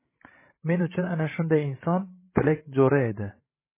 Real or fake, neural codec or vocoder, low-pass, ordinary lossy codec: fake; codec, 16 kHz, 16 kbps, FreqCodec, larger model; 3.6 kHz; MP3, 16 kbps